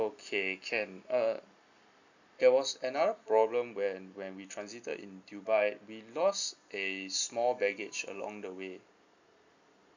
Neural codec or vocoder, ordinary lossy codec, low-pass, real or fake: none; none; 7.2 kHz; real